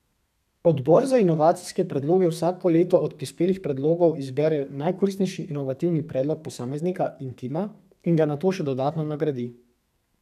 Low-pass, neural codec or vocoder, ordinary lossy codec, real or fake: 14.4 kHz; codec, 32 kHz, 1.9 kbps, SNAC; none; fake